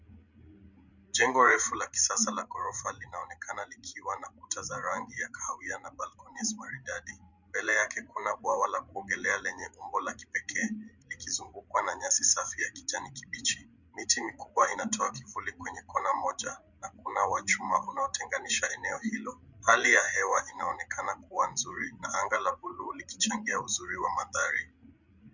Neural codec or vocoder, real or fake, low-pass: codec, 16 kHz, 16 kbps, FreqCodec, larger model; fake; 7.2 kHz